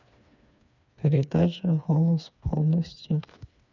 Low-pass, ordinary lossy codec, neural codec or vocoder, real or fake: 7.2 kHz; none; codec, 16 kHz, 4 kbps, FreqCodec, smaller model; fake